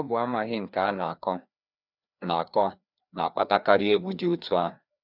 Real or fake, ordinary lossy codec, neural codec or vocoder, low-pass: fake; none; codec, 16 kHz, 2 kbps, FreqCodec, larger model; 5.4 kHz